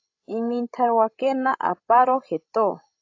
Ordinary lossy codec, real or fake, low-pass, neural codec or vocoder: AAC, 48 kbps; fake; 7.2 kHz; codec, 16 kHz, 16 kbps, FreqCodec, larger model